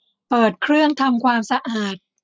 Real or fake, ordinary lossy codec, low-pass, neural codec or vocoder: real; none; none; none